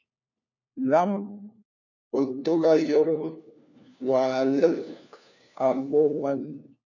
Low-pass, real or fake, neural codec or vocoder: 7.2 kHz; fake; codec, 16 kHz, 1 kbps, FunCodec, trained on LibriTTS, 50 frames a second